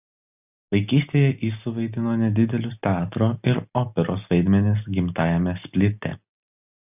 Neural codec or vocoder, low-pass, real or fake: none; 3.6 kHz; real